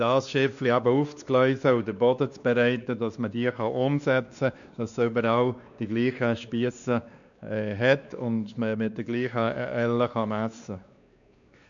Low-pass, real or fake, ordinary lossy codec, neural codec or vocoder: 7.2 kHz; fake; none; codec, 16 kHz, 2 kbps, X-Codec, WavLM features, trained on Multilingual LibriSpeech